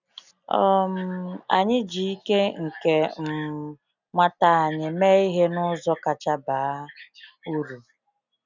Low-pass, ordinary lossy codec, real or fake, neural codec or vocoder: 7.2 kHz; none; real; none